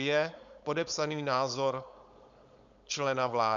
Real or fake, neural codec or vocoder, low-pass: fake; codec, 16 kHz, 4.8 kbps, FACodec; 7.2 kHz